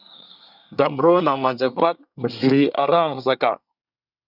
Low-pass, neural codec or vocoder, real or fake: 5.4 kHz; codec, 24 kHz, 1 kbps, SNAC; fake